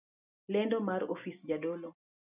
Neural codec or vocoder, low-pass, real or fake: none; 3.6 kHz; real